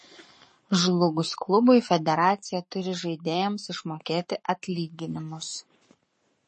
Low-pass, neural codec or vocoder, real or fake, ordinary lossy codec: 10.8 kHz; codec, 44.1 kHz, 7.8 kbps, Pupu-Codec; fake; MP3, 32 kbps